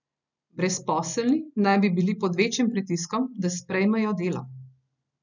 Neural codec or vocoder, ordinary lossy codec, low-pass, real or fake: none; none; 7.2 kHz; real